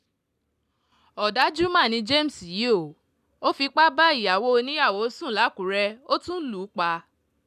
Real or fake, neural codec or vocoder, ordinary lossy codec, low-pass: real; none; none; 14.4 kHz